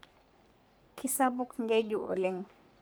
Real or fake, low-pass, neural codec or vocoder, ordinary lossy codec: fake; none; codec, 44.1 kHz, 3.4 kbps, Pupu-Codec; none